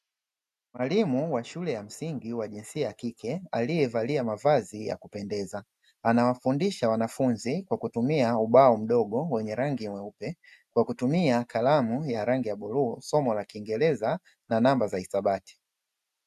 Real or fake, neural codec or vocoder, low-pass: real; none; 14.4 kHz